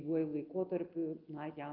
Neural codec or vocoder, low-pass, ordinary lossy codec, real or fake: none; 5.4 kHz; Opus, 24 kbps; real